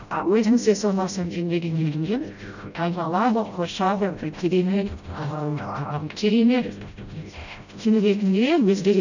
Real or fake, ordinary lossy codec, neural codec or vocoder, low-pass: fake; none; codec, 16 kHz, 0.5 kbps, FreqCodec, smaller model; 7.2 kHz